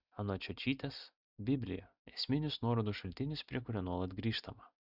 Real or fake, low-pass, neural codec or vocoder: real; 5.4 kHz; none